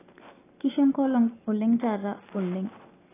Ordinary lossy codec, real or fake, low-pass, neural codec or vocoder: AAC, 16 kbps; real; 3.6 kHz; none